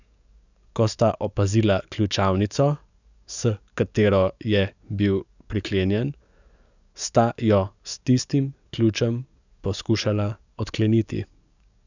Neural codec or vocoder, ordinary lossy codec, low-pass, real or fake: codec, 44.1 kHz, 7.8 kbps, DAC; none; 7.2 kHz; fake